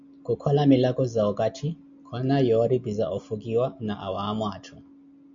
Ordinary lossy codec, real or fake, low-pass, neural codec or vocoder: MP3, 64 kbps; real; 7.2 kHz; none